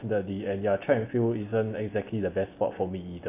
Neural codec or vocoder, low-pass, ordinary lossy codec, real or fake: none; 3.6 kHz; AAC, 24 kbps; real